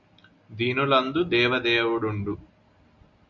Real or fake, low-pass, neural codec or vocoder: real; 7.2 kHz; none